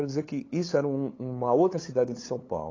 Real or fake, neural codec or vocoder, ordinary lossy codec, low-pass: fake; codec, 16 kHz, 8 kbps, FunCodec, trained on LibriTTS, 25 frames a second; AAC, 32 kbps; 7.2 kHz